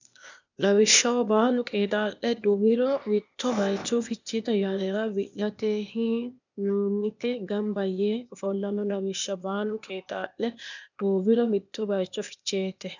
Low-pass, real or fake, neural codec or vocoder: 7.2 kHz; fake; codec, 16 kHz, 0.8 kbps, ZipCodec